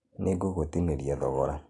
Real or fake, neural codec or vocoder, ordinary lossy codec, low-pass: real; none; AAC, 48 kbps; 10.8 kHz